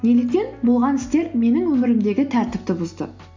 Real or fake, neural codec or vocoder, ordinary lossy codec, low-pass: real; none; MP3, 64 kbps; 7.2 kHz